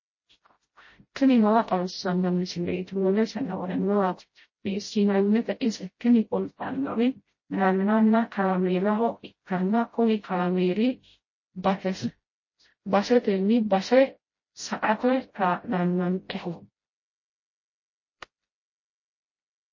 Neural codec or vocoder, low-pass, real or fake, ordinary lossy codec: codec, 16 kHz, 0.5 kbps, FreqCodec, smaller model; 7.2 kHz; fake; MP3, 32 kbps